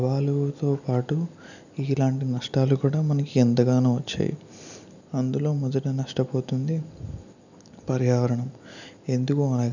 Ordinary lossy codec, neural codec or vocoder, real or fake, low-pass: none; none; real; 7.2 kHz